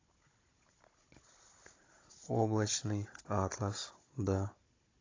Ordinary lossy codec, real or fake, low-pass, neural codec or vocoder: AAC, 32 kbps; fake; 7.2 kHz; codec, 16 kHz, 16 kbps, FunCodec, trained on Chinese and English, 50 frames a second